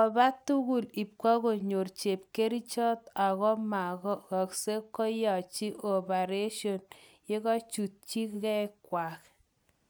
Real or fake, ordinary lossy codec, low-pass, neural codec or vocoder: real; none; none; none